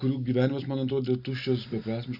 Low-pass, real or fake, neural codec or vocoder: 5.4 kHz; real; none